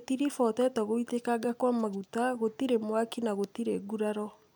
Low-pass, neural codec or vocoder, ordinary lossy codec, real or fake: none; none; none; real